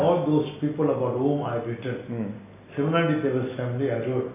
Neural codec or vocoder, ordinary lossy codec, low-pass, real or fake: none; none; 3.6 kHz; real